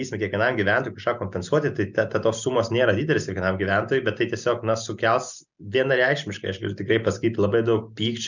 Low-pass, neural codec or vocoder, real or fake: 7.2 kHz; none; real